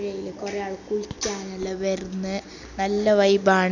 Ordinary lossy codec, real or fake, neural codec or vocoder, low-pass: none; real; none; 7.2 kHz